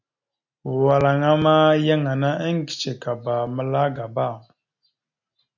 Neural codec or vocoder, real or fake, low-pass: none; real; 7.2 kHz